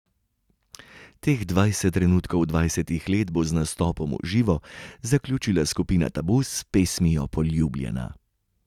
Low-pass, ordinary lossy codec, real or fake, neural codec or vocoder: 19.8 kHz; none; real; none